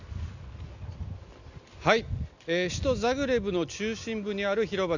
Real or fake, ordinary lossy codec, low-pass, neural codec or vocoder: real; none; 7.2 kHz; none